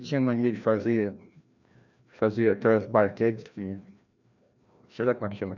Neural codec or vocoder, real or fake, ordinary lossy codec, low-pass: codec, 16 kHz, 1 kbps, FreqCodec, larger model; fake; none; 7.2 kHz